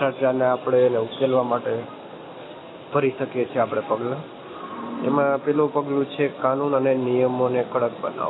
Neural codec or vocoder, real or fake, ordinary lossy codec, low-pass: none; real; AAC, 16 kbps; 7.2 kHz